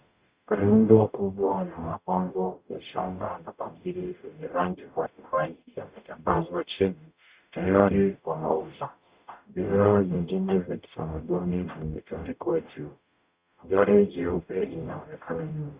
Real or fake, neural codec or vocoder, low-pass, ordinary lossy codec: fake; codec, 44.1 kHz, 0.9 kbps, DAC; 3.6 kHz; Opus, 64 kbps